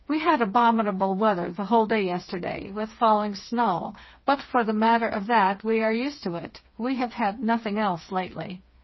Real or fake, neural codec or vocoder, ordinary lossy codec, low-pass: fake; codec, 16 kHz, 4 kbps, FreqCodec, smaller model; MP3, 24 kbps; 7.2 kHz